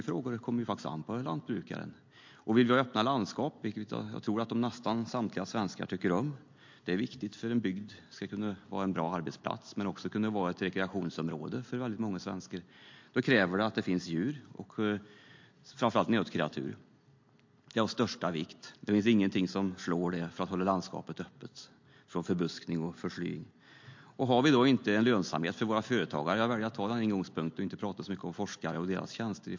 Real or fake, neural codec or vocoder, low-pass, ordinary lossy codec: real; none; 7.2 kHz; MP3, 48 kbps